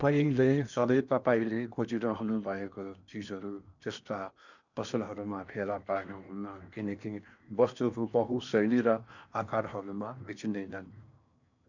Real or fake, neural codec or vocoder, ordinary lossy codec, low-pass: fake; codec, 16 kHz in and 24 kHz out, 0.8 kbps, FocalCodec, streaming, 65536 codes; none; 7.2 kHz